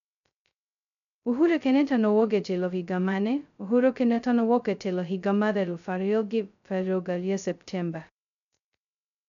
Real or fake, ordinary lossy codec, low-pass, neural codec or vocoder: fake; none; 7.2 kHz; codec, 16 kHz, 0.2 kbps, FocalCodec